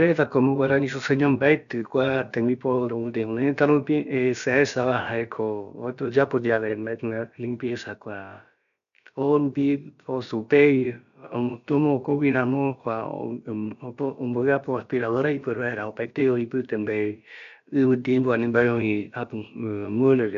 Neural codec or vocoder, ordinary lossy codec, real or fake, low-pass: codec, 16 kHz, about 1 kbps, DyCAST, with the encoder's durations; none; fake; 7.2 kHz